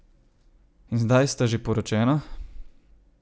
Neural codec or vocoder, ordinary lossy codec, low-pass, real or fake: none; none; none; real